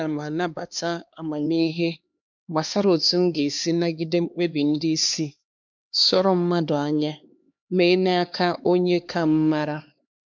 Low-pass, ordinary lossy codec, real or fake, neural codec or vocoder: 7.2 kHz; MP3, 64 kbps; fake; codec, 16 kHz, 2 kbps, X-Codec, HuBERT features, trained on LibriSpeech